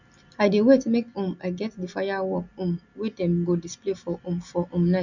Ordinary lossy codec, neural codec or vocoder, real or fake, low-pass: none; none; real; 7.2 kHz